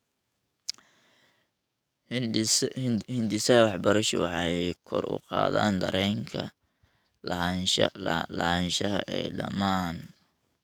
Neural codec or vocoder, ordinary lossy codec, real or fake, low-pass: codec, 44.1 kHz, 7.8 kbps, DAC; none; fake; none